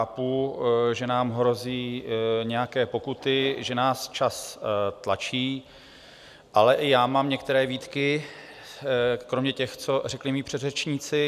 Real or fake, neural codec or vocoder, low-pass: real; none; 14.4 kHz